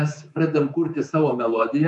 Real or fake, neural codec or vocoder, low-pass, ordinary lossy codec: fake; codec, 24 kHz, 3.1 kbps, DualCodec; 10.8 kHz; MP3, 64 kbps